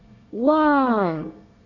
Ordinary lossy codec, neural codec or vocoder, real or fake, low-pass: none; codec, 24 kHz, 1 kbps, SNAC; fake; 7.2 kHz